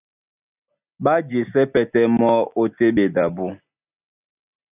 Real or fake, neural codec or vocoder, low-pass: real; none; 3.6 kHz